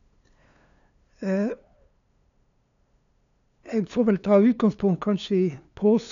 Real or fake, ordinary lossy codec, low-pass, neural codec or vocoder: fake; none; 7.2 kHz; codec, 16 kHz, 2 kbps, FunCodec, trained on LibriTTS, 25 frames a second